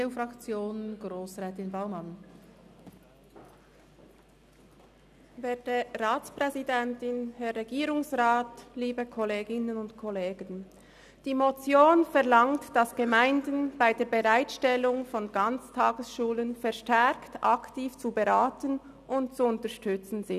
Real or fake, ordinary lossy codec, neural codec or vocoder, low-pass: real; none; none; 14.4 kHz